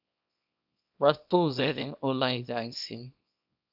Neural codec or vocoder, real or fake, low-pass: codec, 24 kHz, 0.9 kbps, WavTokenizer, small release; fake; 5.4 kHz